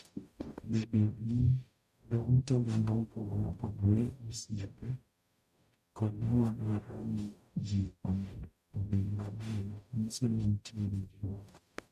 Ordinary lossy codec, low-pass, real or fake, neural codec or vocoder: MP3, 96 kbps; 14.4 kHz; fake; codec, 44.1 kHz, 0.9 kbps, DAC